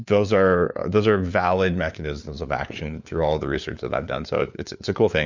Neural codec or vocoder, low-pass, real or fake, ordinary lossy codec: codec, 16 kHz, 4 kbps, FunCodec, trained on LibriTTS, 50 frames a second; 7.2 kHz; fake; MP3, 64 kbps